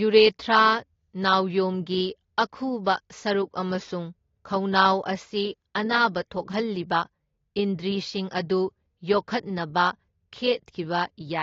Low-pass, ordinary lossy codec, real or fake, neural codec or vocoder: 7.2 kHz; AAC, 32 kbps; real; none